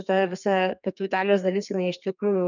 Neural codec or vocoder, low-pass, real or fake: codec, 32 kHz, 1.9 kbps, SNAC; 7.2 kHz; fake